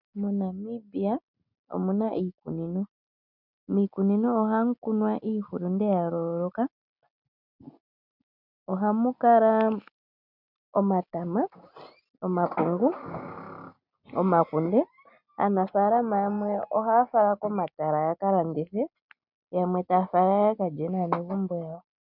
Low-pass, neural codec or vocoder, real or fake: 5.4 kHz; none; real